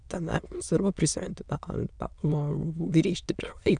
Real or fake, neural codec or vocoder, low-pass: fake; autoencoder, 22.05 kHz, a latent of 192 numbers a frame, VITS, trained on many speakers; 9.9 kHz